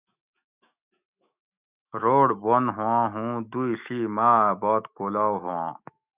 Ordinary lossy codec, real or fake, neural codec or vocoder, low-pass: Opus, 64 kbps; fake; autoencoder, 48 kHz, 128 numbers a frame, DAC-VAE, trained on Japanese speech; 3.6 kHz